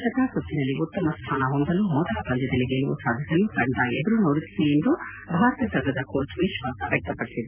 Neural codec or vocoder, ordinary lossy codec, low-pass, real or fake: none; none; 3.6 kHz; real